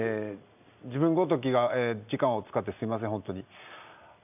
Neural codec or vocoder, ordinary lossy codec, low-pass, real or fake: none; none; 3.6 kHz; real